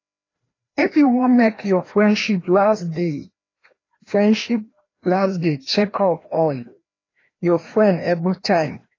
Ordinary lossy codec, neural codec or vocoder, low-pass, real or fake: AAC, 32 kbps; codec, 16 kHz, 1 kbps, FreqCodec, larger model; 7.2 kHz; fake